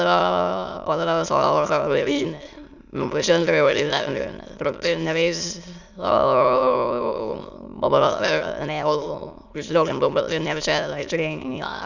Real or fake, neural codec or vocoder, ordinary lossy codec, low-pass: fake; autoencoder, 22.05 kHz, a latent of 192 numbers a frame, VITS, trained on many speakers; none; 7.2 kHz